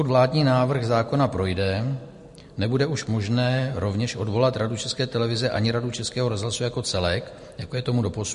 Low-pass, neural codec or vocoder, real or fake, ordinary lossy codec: 14.4 kHz; none; real; MP3, 48 kbps